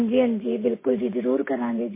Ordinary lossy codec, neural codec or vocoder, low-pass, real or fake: MP3, 16 kbps; none; 3.6 kHz; real